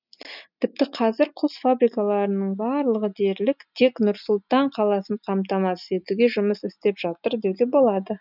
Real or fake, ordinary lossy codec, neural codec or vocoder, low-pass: real; none; none; 5.4 kHz